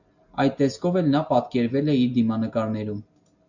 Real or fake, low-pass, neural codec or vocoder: real; 7.2 kHz; none